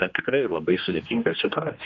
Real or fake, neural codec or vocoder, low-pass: fake; codec, 16 kHz, 2 kbps, X-Codec, HuBERT features, trained on general audio; 7.2 kHz